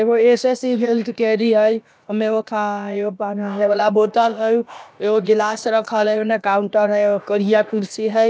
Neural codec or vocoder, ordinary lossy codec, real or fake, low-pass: codec, 16 kHz, about 1 kbps, DyCAST, with the encoder's durations; none; fake; none